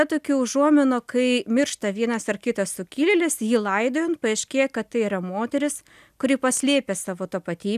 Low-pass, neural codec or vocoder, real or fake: 14.4 kHz; none; real